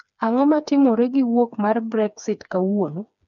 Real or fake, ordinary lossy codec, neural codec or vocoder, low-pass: fake; none; codec, 16 kHz, 4 kbps, FreqCodec, smaller model; 7.2 kHz